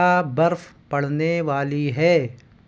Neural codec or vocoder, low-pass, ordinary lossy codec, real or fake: none; none; none; real